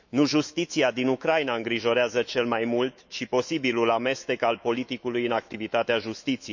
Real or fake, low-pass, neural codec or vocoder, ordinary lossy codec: fake; 7.2 kHz; autoencoder, 48 kHz, 128 numbers a frame, DAC-VAE, trained on Japanese speech; none